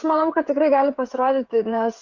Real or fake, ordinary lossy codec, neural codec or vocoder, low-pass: fake; Opus, 64 kbps; codec, 16 kHz, 16 kbps, FreqCodec, smaller model; 7.2 kHz